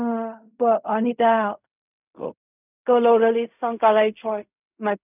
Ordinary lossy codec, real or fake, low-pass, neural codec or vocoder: none; fake; 3.6 kHz; codec, 16 kHz in and 24 kHz out, 0.4 kbps, LongCat-Audio-Codec, fine tuned four codebook decoder